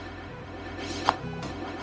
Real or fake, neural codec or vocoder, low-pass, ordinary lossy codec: fake; codec, 16 kHz, 0.4 kbps, LongCat-Audio-Codec; none; none